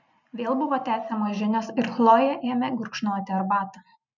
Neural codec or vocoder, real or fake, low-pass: none; real; 7.2 kHz